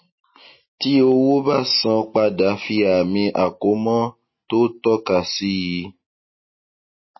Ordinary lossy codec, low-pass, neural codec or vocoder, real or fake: MP3, 24 kbps; 7.2 kHz; none; real